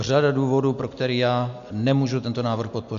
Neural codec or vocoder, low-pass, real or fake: none; 7.2 kHz; real